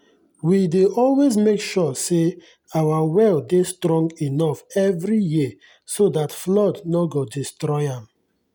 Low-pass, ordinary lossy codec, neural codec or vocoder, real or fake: none; none; none; real